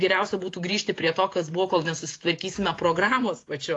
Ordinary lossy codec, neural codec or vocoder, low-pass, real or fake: AAC, 48 kbps; vocoder, 48 kHz, 128 mel bands, Vocos; 10.8 kHz; fake